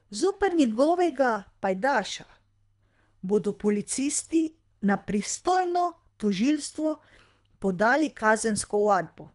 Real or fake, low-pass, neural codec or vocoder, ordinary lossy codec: fake; 10.8 kHz; codec, 24 kHz, 3 kbps, HILCodec; none